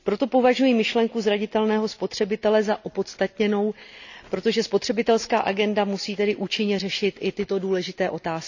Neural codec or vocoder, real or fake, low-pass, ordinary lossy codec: none; real; 7.2 kHz; none